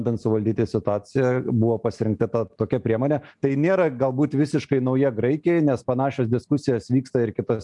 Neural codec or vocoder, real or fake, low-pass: none; real; 10.8 kHz